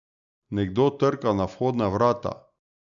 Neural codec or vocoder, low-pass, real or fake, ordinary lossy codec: none; 7.2 kHz; real; none